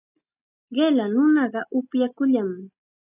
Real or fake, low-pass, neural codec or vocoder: real; 3.6 kHz; none